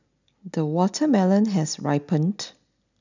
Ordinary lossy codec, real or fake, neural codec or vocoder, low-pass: none; real; none; 7.2 kHz